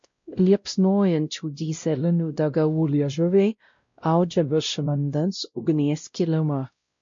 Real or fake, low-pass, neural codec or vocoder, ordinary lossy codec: fake; 7.2 kHz; codec, 16 kHz, 0.5 kbps, X-Codec, WavLM features, trained on Multilingual LibriSpeech; MP3, 48 kbps